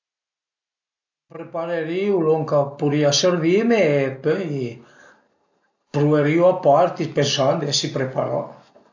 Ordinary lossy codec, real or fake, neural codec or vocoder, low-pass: AAC, 48 kbps; real; none; 7.2 kHz